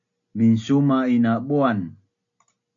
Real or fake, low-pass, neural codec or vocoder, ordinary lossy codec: real; 7.2 kHz; none; AAC, 64 kbps